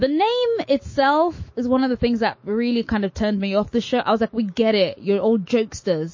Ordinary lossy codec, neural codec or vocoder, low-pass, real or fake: MP3, 32 kbps; autoencoder, 48 kHz, 128 numbers a frame, DAC-VAE, trained on Japanese speech; 7.2 kHz; fake